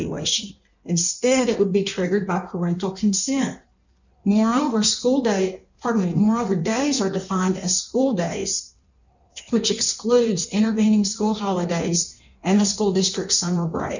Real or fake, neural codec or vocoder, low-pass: fake; codec, 16 kHz in and 24 kHz out, 1.1 kbps, FireRedTTS-2 codec; 7.2 kHz